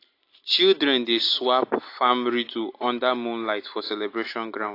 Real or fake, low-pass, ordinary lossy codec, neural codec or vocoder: real; 5.4 kHz; AAC, 32 kbps; none